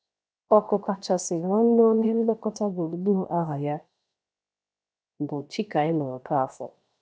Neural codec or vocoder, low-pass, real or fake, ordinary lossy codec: codec, 16 kHz, 0.7 kbps, FocalCodec; none; fake; none